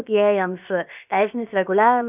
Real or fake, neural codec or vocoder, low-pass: fake; codec, 16 kHz, 0.7 kbps, FocalCodec; 3.6 kHz